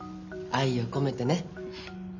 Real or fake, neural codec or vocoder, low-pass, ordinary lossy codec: real; none; 7.2 kHz; none